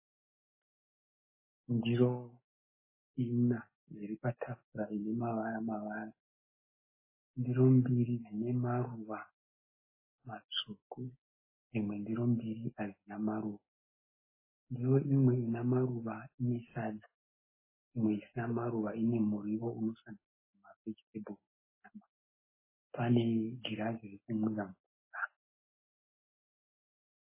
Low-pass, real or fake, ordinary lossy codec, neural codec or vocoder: 3.6 kHz; real; MP3, 16 kbps; none